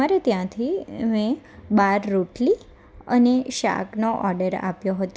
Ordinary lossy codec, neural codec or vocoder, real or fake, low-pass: none; none; real; none